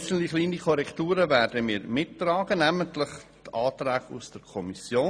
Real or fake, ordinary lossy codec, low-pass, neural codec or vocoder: real; none; none; none